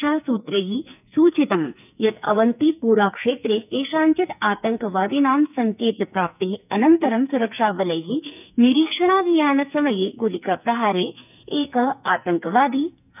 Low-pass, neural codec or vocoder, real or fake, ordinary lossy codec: 3.6 kHz; codec, 44.1 kHz, 2.6 kbps, SNAC; fake; none